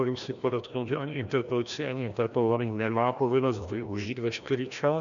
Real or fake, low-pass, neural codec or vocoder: fake; 7.2 kHz; codec, 16 kHz, 1 kbps, FreqCodec, larger model